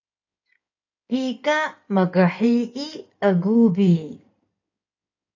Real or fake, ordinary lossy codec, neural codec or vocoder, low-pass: fake; AAC, 48 kbps; codec, 16 kHz in and 24 kHz out, 2.2 kbps, FireRedTTS-2 codec; 7.2 kHz